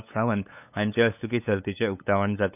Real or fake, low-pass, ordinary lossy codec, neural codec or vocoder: fake; 3.6 kHz; none; codec, 16 kHz, 8 kbps, FunCodec, trained on LibriTTS, 25 frames a second